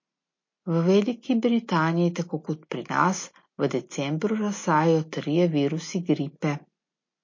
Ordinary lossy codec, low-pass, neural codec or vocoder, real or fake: MP3, 32 kbps; 7.2 kHz; none; real